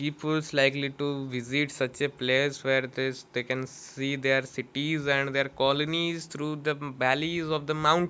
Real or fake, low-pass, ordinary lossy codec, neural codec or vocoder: real; none; none; none